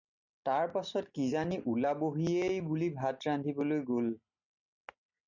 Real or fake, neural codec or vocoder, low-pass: real; none; 7.2 kHz